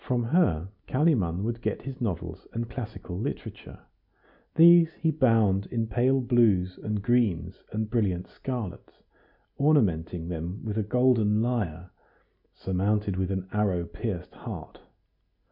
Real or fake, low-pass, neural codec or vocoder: real; 5.4 kHz; none